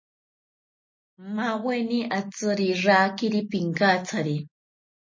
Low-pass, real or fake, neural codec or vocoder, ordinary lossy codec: 7.2 kHz; real; none; MP3, 32 kbps